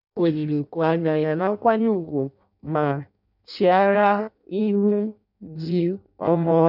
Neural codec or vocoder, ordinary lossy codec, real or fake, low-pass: codec, 16 kHz in and 24 kHz out, 0.6 kbps, FireRedTTS-2 codec; none; fake; 5.4 kHz